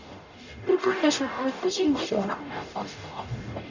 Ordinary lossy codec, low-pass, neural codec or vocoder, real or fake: none; 7.2 kHz; codec, 44.1 kHz, 0.9 kbps, DAC; fake